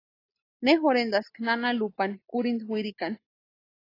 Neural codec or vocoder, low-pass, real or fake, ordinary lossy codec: none; 5.4 kHz; real; AAC, 24 kbps